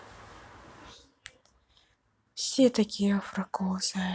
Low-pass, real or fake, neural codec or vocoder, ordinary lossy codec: none; real; none; none